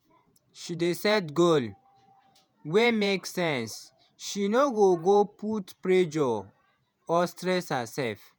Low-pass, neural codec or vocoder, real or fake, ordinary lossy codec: none; vocoder, 48 kHz, 128 mel bands, Vocos; fake; none